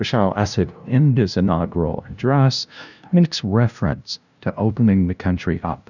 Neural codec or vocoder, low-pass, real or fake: codec, 16 kHz, 0.5 kbps, FunCodec, trained on LibriTTS, 25 frames a second; 7.2 kHz; fake